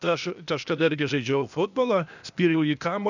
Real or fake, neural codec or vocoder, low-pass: fake; codec, 16 kHz, 0.8 kbps, ZipCodec; 7.2 kHz